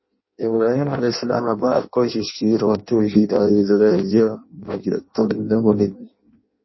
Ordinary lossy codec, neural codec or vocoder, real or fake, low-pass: MP3, 24 kbps; codec, 16 kHz in and 24 kHz out, 0.6 kbps, FireRedTTS-2 codec; fake; 7.2 kHz